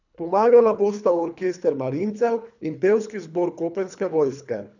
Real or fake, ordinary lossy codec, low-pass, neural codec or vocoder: fake; none; 7.2 kHz; codec, 24 kHz, 3 kbps, HILCodec